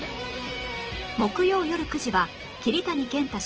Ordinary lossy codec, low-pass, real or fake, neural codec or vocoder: Opus, 16 kbps; 7.2 kHz; real; none